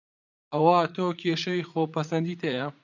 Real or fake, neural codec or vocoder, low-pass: fake; vocoder, 44.1 kHz, 80 mel bands, Vocos; 7.2 kHz